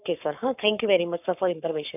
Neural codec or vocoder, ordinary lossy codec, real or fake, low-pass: codec, 44.1 kHz, 7.8 kbps, Pupu-Codec; none; fake; 3.6 kHz